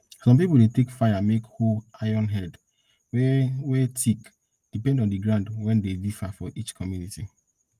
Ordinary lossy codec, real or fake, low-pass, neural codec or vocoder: Opus, 32 kbps; real; 14.4 kHz; none